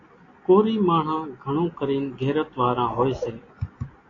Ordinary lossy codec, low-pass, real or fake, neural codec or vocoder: AAC, 32 kbps; 7.2 kHz; real; none